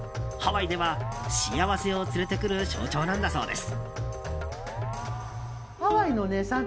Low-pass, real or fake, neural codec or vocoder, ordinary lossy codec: none; real; none; none